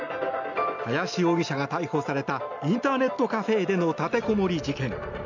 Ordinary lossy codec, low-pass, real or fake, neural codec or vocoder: none; 7.2 kHz; real; none